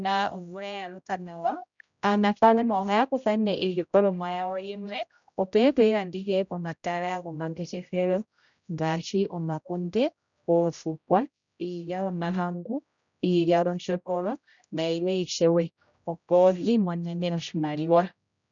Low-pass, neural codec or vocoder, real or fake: 7.2 kHz; codec, 16 kHz, 0.5 kbps, X-Codec, HuBERT features, trained on general audio; fake